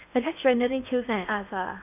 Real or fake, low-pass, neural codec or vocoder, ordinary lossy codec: fake; 3.6 kHz; codec, 16 kHz in and 24 kHz out, 0.8 kbps, FocalCodec, streaming, 65536 codes; none